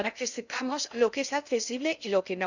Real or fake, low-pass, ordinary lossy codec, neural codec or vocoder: fake; 7.2 kHz; none; codec, 16 kHz in and 24 kHz out, 0.6 kbps, FocalCodec, streaming, 2048 codes